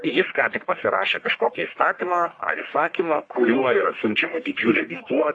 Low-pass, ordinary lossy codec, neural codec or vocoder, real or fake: 9.9 kHz; AAC, 48 kbps; codec, 44.1 kHz, 1.7 kbps, Pupu-Codec; fake